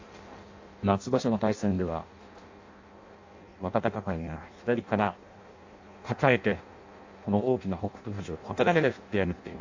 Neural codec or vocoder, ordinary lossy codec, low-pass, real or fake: codec, 16 kHz in and 24 kHz out, 0.6 kbps, FireRedTTS-2 codec; AAC, 48 kbps; 7.2 kHz; fake